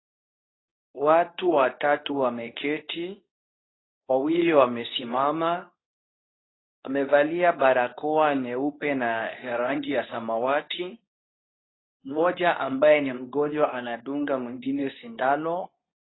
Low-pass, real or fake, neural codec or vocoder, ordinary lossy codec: 7.2 kHz; fake; codec, 24 kHz, 0.9 kbps, WavTokenizer, medium speech release version 2; AAC, 16 kbps